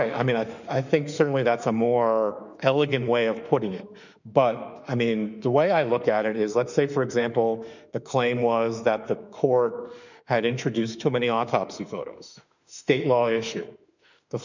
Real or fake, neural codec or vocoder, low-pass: fake; autoencoder, 48 kHz, 32 numbers a frame, DAC-VAE, trained on Japanese speech; 7.2 kHz